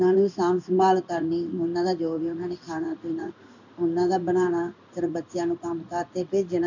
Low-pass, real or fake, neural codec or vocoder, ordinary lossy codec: 7.2 kHz; fake; codec, 16 kHz in and 24 kHz out, 1 kbps, XY-Tokenizer; none